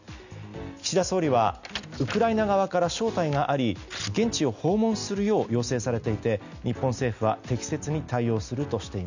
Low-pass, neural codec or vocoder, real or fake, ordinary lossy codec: 7.2 kHz; none; real; none